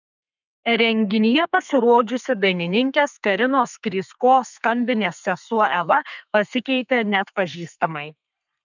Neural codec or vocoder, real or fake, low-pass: codec, 32 kHz, 1.9 kbps, SNAC; fake; 7.2 kHz